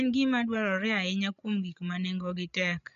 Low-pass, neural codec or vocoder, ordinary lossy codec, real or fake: 7.2 kHz; codec, 16 kHz, 16 kbps, FreqCodec, larger model; none; fake